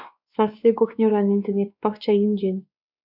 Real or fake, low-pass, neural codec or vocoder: fake; 5.4 kHz; codec, 16 kHz, 2 kbps, X-Codec, WavLM features, trained on Multilingual LibriSpeech